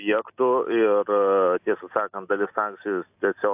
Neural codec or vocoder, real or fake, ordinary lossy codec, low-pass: none; real; AAC, 32 kbps; 3.6 kHz